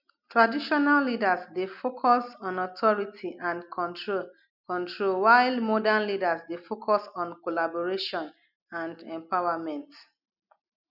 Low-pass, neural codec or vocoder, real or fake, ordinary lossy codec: 5.4 kHz; none; real; none